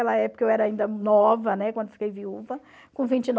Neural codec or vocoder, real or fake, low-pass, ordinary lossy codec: none; real; none; none